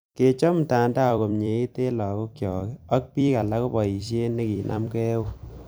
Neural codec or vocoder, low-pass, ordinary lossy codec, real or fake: vocoder, 44.1 kHz, 128 mel bands every 256 samples, BigVGAN v2; none; none; fake